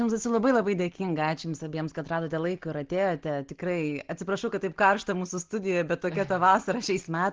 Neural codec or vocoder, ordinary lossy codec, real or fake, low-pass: none; Opus, 16 kbps; real; 7.2 kHz